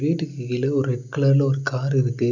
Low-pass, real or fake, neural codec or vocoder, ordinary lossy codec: 7.2 kHz; real; none; none